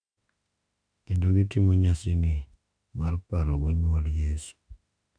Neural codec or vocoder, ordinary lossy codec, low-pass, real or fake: autoencoder, 48 kHz, 32 numbers a frame, DAC-VAE, trained on Japanese speech; none; 9.9 kHz; fake